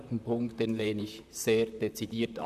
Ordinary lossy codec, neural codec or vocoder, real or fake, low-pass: none; vocoder, 44.1 kHz, 128 mel bands, Pupu-Vocoder; fake; 14.4 kHz